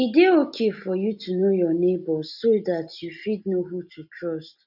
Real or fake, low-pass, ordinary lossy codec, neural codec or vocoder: real; 5.4 kHz; none; none